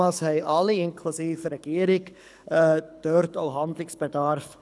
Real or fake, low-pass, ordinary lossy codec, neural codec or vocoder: fake; none; none; codec, 24 kHz, 6 kbps, HILCodec